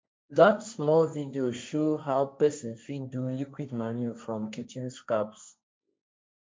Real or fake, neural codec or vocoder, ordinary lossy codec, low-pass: fake; codec, 16 kHz, 1.1 kbps, Voila-Tokenizer; none; none